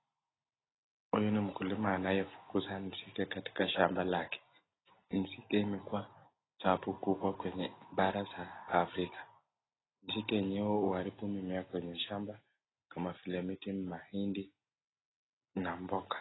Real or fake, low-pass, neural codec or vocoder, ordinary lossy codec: real; 7.2 kHz; none; AAC, 16 kbps